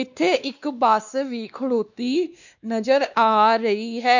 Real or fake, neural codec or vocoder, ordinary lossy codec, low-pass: fake; codec, 16 kHz, 2 kbps, X-Codec, WavLM features, trained on Multilingual LibriSpeech; none; 7.2 kHz